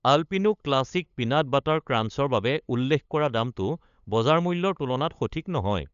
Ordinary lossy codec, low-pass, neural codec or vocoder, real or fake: none; 7.2 kHz; codec, 16 kHz, 8 kbps, FunCodec, trained on LibriTTS, 25 frames a second; fake